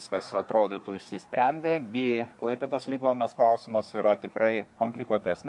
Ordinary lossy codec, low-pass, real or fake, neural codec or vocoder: MP3, 64 kbps; 10.8 kHz; fake; codec, 24 kHz, 1 kbps, SNAC